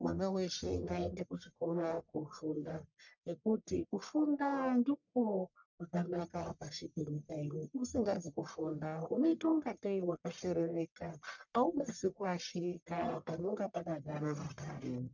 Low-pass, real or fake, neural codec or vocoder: 7.2 kHz; fake; codec, 44.1 kHz, 1.7 kbps, Pupu-Codec